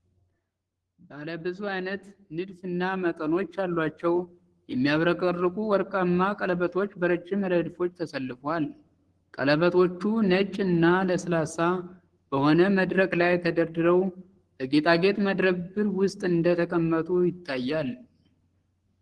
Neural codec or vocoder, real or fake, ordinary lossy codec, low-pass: codec, 44.1 kHz, 7.8 kbps, Pupu-Codec; fake; Opus, 16 kbps; 10.8 kHz